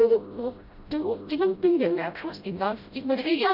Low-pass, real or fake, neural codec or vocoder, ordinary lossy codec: 5.4 kHz; fake; codec, 16 kHz, 0.5 kbps, FreqCodec, smaller model; none